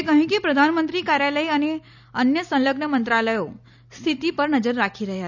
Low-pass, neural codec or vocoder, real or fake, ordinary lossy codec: 7.2 kHz; none; real; none